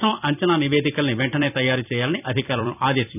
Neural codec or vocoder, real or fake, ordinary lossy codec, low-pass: vocoder, 44.1 kHz, 128 mel bands every 256 samples, BigVGAN v2; fake; none; 3.6 kHz